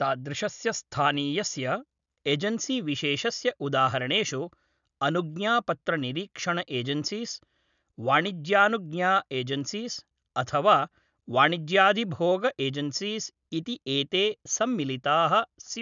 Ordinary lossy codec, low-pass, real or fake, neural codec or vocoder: none; 7.2 kHz; real; none